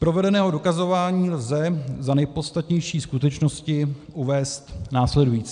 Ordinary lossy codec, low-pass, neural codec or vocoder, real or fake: MP3, 96 kbps; 9.9 kHz; none; real